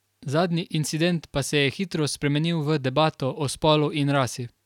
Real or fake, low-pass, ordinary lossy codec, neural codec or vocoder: real; 19.8 kHz; none; none